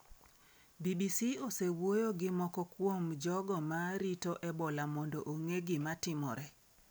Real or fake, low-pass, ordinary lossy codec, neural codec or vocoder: real; none; none; none